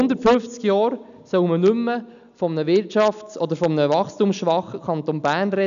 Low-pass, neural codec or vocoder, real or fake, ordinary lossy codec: 7.2 kHz; none; real; none